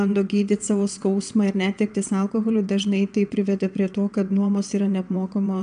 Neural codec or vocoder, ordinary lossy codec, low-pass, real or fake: vocoder, 22.05 kHz, 80 mel bands, WaveNeXt; AAC, 96 kbps; 9.9 kHz; fake